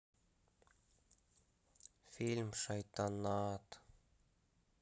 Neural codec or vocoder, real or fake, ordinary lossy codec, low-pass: none; real; none; none